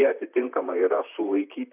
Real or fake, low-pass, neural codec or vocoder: fake; 3.6 kHz; vocoder, 44.1 kHz, 128 mel bands, Pupu-Vocoder